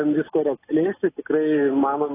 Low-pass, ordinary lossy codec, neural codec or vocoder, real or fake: 3.6 kHz; AAC, 16 kbps; none; real